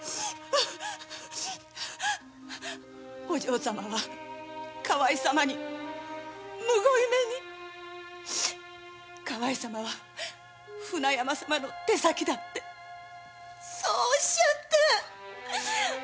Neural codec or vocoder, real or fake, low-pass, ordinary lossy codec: none; real; none; none